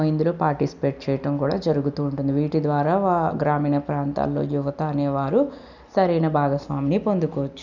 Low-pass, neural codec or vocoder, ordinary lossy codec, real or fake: 7.2 kHz; none; none; real